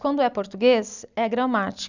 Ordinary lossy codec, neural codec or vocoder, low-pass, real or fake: none; none; 7.2 kHz; real